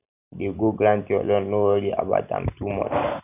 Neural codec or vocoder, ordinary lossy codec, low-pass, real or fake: none; none; 3.6 kHz; real